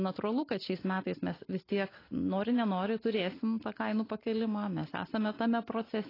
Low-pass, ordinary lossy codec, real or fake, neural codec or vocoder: 5.4 kHz; AAC, 24 kbps; real; none